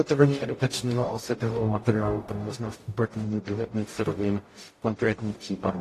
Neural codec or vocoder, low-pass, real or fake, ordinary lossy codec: codec, 44.1 kHz, 0.9 kbps, DAC; 14.4 kHz; fake; AAC, 48 kbps